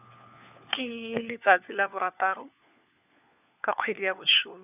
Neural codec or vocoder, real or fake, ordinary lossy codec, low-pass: codec, 16 kHz, 4 kbps, FunCodec, trained on LibriTTS, 50 frames a second; fake; none; 3.6 kHz